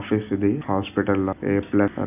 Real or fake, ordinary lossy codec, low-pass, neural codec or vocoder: real; none; 3.6 kHz; none